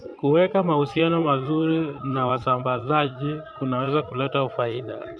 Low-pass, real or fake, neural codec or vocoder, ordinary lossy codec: none; fake; vocoder, 22.05 kHz, 80 mel bands, Vocos; none